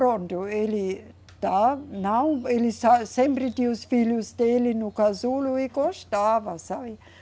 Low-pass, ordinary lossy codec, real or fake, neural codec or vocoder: none; none; real; none